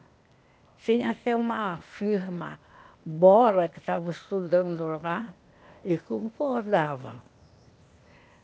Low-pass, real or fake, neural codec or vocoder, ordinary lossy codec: none; fake; codec, 16 kHz, 0.8 kbps, ZipCodec; none